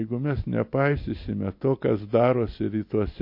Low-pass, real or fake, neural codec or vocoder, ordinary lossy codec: 5.4 kHz; real; none; MP3, 32 kbps